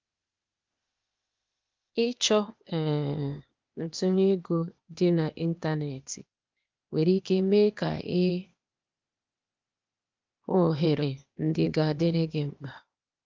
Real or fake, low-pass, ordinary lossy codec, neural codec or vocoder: fake; 7.2 kHz; Opus, 24 kbps; codec, 16 kHz, 0.8 kbps, ZipCodec